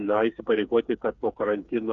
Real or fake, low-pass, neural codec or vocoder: fake; 7.2 kHz; codec, 16 kHz, 4 kbps, FreqCodec, smaller model